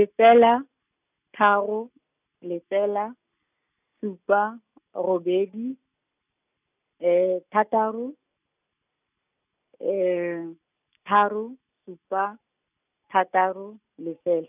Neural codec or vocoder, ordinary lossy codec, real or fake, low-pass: none; none; real; 3.6 kHz